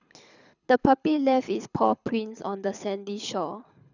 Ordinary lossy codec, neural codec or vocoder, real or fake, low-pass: none; codec, 24 kHz, 6 kbps, HILCodec; fake; 7.2 kHz